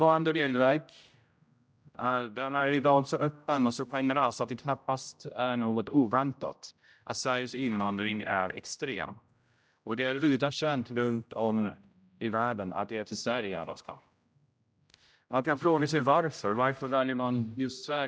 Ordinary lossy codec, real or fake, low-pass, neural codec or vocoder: none; fake; none; codec, 16 kHz, 0.5 kbps, X-Codec, HuBERT features, trained on general audio